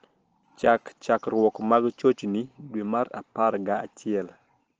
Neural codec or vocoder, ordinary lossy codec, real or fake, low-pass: none; Opus, 16 kbps; real; 7.2 kHz